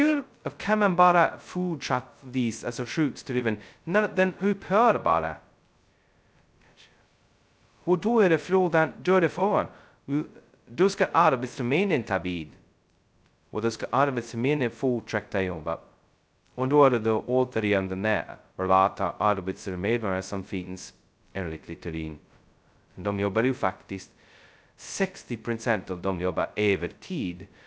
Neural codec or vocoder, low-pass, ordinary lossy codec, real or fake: codec, 16 kHz, 0.2 kbps, FocalCodec; none; none; fake